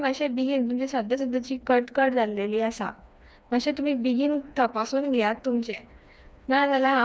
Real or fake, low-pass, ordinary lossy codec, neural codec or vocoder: fake; none; none; codec, 16 kHz, 2 kbps, FreqCodec, smaller model